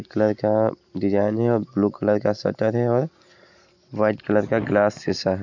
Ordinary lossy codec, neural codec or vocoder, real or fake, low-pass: none; none; real; 7.2 kHz